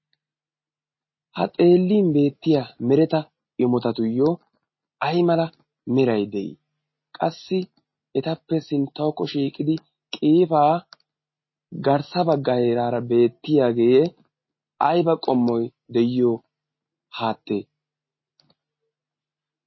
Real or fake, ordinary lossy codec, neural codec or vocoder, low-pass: real; MP3, 24 kbps; none; 7.2 kHz